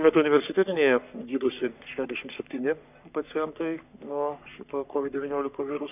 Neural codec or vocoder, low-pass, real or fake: codec, 44.1 kHz, 3.4 kbps, Pupu-Codec; 3.6 kHz; fake